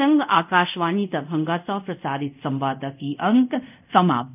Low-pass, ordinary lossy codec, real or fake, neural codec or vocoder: 3.6 kHz; none; fake; codec, 24 kHz, 0.5 kbps, DualCodec